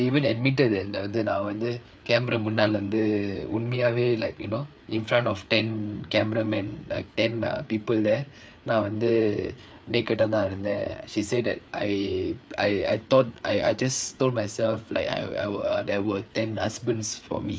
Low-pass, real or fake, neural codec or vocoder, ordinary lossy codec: none; fake; codec, 16 kHz, 4 kbps, FreqCodec, larger model; none